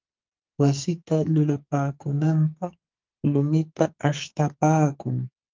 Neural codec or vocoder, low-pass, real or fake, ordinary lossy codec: codec, 44.1 kHz, 2.6 kbps, SNAC; 7.2 kHz; fake; Opus, 24 kbps